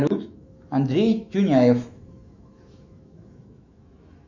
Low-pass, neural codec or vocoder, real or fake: 7.2 kHz; autoencoder, 48 kHz, 128 numbers a frame, DAC-VAE, trained on Japanese speech; fake